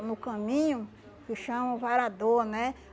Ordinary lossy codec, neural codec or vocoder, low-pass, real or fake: none; none; none; real